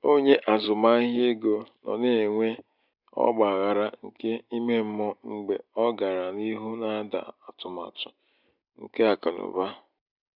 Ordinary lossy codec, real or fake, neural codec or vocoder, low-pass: none; real; none; 5.4 kHz